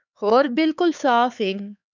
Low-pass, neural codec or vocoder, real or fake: 7.2 kHz; codec, 16 kHz, 4 kbps, X-Codec, HuBERT features, trained on LibriSpeech; fake